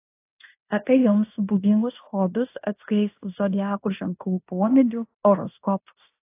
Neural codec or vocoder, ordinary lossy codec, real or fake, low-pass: codec, 16 kHz in and 24 kHz out, 0.9 kbps, LongCat-Audio-Codec, fine tuned four codebook decoder; AAC, 24 kbps; fake; 3.6 kHz